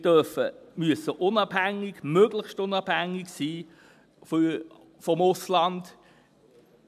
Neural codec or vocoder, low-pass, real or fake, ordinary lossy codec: none; 14.4 kHz; real; none